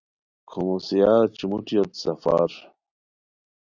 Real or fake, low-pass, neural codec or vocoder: real; 7.2 kHz; none